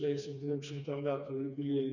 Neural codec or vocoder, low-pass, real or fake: codec, 16 kHz, 2 kbps, FreqCodec, smaller model; 7.2 kHz; fake